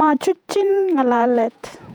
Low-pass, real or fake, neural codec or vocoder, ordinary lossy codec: 19.8 kHz; fake; vocoder, 48 kHz, 128 mel bands, Vocos; none